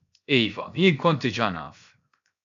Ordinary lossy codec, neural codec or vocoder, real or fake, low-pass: AAC, 96 kbps; codec, 16 kHz, 0.7 kbps, FocalCodec; fake; 7.2 kHz